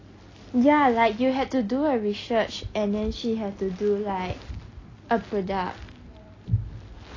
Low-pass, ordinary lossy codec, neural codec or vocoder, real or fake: 7.2 kHz; AAC, 32 kbps; none; real